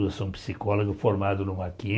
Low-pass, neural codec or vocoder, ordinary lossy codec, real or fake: none; none; none; real